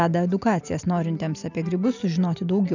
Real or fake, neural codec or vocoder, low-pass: real; none; 7.2 kHz